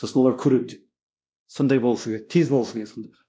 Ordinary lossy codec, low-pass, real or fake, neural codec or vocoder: none; none; fake; codec, 16 kHz, 1 kbps, X-Codec, WavLM features, trained on Multilingual LibriSpeech